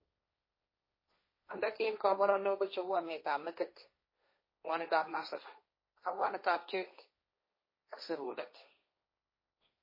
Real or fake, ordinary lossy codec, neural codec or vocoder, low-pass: fake; MP3, 24 kbps; codec, 16 kHz, 1.1 kbps, Voila-Tokenizer; 5.4 kHz